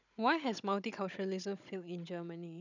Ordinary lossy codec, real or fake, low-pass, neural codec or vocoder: none; fake; 7.2 kHz; codec, 16 kHz, 16 kbps, FunCodec, trained on Chinese and English, 50 frames a second